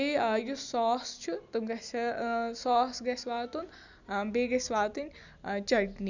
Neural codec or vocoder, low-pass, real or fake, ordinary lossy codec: none; 7.2 kHz; real; none